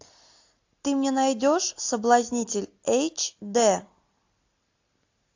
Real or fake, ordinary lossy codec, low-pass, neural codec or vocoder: real; AAC, 48 kbps; 7.2 kHz; none